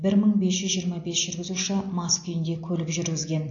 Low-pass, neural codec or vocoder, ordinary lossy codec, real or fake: 7.2 kHz; none; AAC, 48 kbps; real